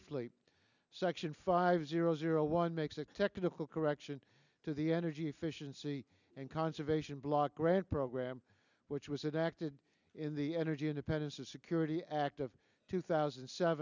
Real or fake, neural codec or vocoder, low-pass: real; none; 7.2 kHz